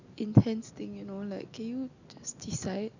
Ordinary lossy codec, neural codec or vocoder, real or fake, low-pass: none; none; real; 7.2 kHz